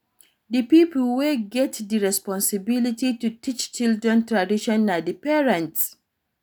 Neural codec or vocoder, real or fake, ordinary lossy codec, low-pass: none; real; none; none